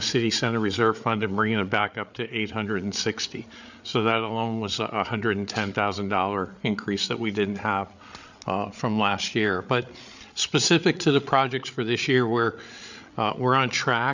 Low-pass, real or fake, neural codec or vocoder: 7.2 kHz; fake; codec, 16 kHz, 8 kbps, FreqCodec, larger model